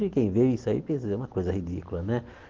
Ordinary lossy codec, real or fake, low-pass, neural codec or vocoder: Opus, 16 kbps; real; 7.2 kHz; none